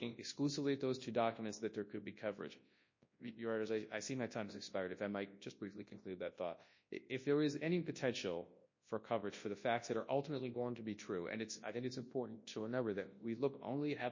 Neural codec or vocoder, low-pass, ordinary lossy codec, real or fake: codec, 24 kHz, 0.9 kbps, WavTokenizer, large speech release; 7.2 kHz; MP3, 32 kbps; fake